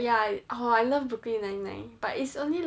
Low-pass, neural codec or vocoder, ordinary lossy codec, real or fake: none; none; none; real